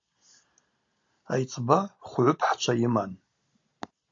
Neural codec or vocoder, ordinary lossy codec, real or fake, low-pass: none; AAC, 48 kbps; real; 7.2 kHz